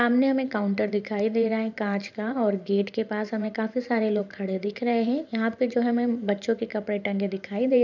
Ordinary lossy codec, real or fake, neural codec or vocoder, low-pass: none; fake; vocoder, 44.1 kHz, 128 mel bands every 512 samples, BigVGAN v2; 7.2 kHz